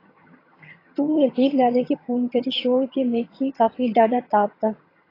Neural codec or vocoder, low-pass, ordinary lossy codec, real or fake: vocoder, 22.05 kHz, 80 mel bands, HiFi-GAN; 5.4 kHz; AAC, 24 kbps; fake